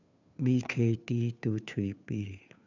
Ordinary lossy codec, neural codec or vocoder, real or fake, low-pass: none; codec, 16 kHz, 2 kbps, FunCodec, trained on Chinese and English, 25 frames a second; fake; 7.2 kHz